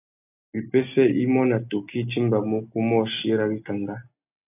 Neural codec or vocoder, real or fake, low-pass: none; real; 3.6 kHz